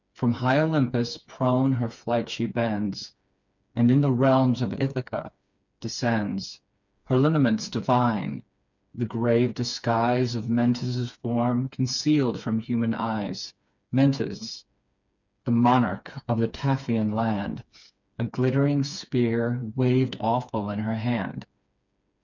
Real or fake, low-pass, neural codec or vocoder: fake; 7.2 kHz; codec, 16 kHz, 4 kbps, FreqCodec, smaller model